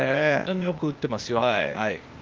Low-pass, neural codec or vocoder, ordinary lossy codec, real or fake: 7.2 kHz; codec, 16 kHz, 0.8 kbps, ZipCodec; Opus, 32 kbps; fake